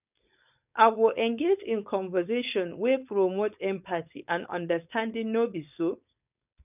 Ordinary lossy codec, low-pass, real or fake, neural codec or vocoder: none; 3.6 kHz; fake; codec, 16 kHz, 4.8 kbps, FACodec